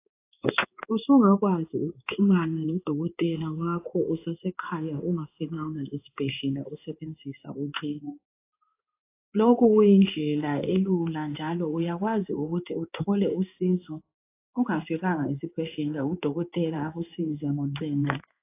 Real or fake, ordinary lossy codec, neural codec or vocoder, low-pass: fake; AAC, 24 kbps; codec, 16 kHz in and 24 kHz out, 1 kbps, XY-Tokenizer; 3.6 kHz